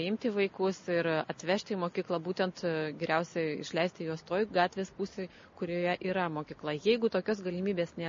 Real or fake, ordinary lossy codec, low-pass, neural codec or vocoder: real; MP3, 32 kbps; 7.2 kHz; none